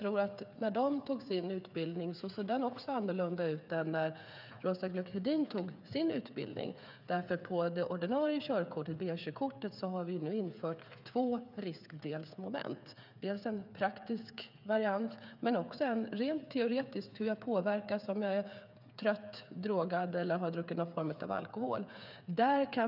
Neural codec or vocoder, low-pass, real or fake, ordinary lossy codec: codec, 16 kHz, 8 kbps, FreqCodec, smaller model; 5.4 kHz; fake; none